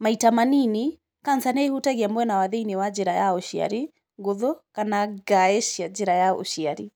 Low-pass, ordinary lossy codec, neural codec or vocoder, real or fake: none; none; none; real